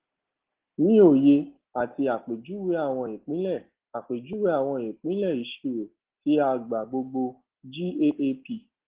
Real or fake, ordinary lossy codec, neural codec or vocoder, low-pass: real; Opus, 16 kbps; none; 3.6 kHz